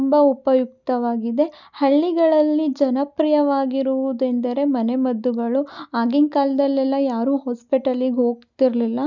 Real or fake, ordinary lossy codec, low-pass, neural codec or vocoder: real; none; 7.2 kHz; none